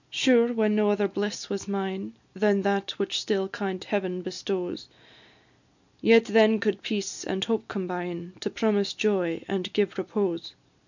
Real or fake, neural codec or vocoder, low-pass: real; none; 7.2 kHz